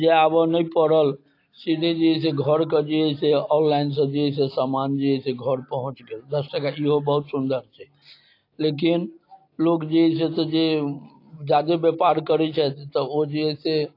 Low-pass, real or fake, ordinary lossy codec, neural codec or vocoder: 5.4 kHz; real; AAC, 32 kbps; none